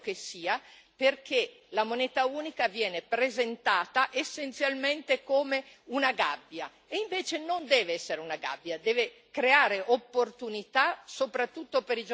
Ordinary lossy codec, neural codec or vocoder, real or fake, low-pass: none; none; real; none